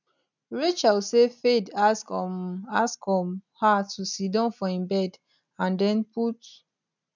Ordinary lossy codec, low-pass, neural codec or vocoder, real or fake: none; 7.2 kHz; none; real